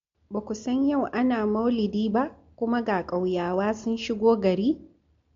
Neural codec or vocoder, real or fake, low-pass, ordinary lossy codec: none; real; 7.2 kHz; MP3, 48 kbps